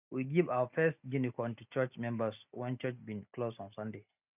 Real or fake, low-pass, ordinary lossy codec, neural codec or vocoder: real; 3.6 kHz; none; none